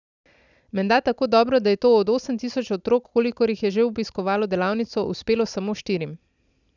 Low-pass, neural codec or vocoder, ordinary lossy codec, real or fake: 7.2 kHz; none; none; real